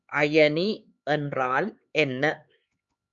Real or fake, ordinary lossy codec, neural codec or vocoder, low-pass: fake; Opus, 64 kbps; codec, 16 kHz, 4 kbps, X-Codec, HuBERT features, trained on LibriSpeech; 7.2 kHz